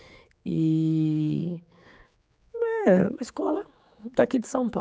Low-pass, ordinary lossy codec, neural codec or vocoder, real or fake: none; none; codec, 16 kHz, 4 kbps, X-Codec, HuBERT features, trained on general audio; fake